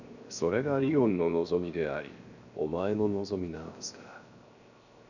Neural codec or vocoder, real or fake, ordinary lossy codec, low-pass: codec, 16 kHz, 0.7 kbps, FocalCodec; fake; none; 7.2 kHz